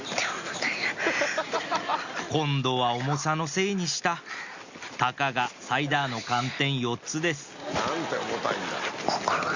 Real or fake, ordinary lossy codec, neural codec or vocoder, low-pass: real; Opus, 64 kbps; none; 7.2 kHz